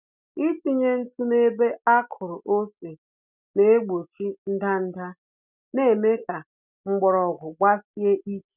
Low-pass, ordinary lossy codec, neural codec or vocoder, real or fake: 3.6 kHz; none; none; real